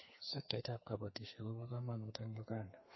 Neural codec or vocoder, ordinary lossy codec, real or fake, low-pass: codec, 32 kHz, 1.9 kbps, SNAC; MP3, 24 kbps; fake; 7.2 kHz